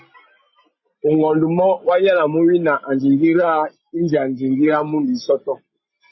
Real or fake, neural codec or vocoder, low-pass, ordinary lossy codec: real; none; 7.2 kHz; MP3, 24 kbps